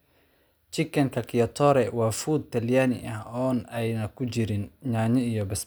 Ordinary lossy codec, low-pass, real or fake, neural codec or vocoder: none; none; real; none